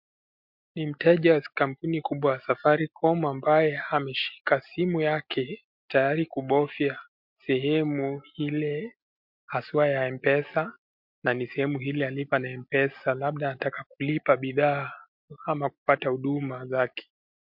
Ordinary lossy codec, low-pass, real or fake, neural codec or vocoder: MP3, 48 kbps; 5.4 kHz; real; none